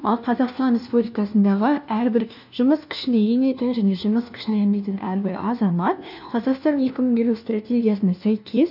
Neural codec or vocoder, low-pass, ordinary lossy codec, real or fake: codec, 16 kHz, 1 kbps, FunCodec, trained on LibriTTS, 50 frames a second; 5.4 kHz; none; fake